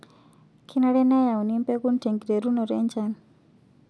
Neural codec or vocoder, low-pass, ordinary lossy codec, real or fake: none; none; none; real